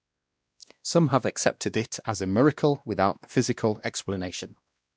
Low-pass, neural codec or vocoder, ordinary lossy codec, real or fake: none; codec, 16 kHz, 1 kbps, X-Codec, WavLM features, trained on Multilingual LibriSpeech; none; fake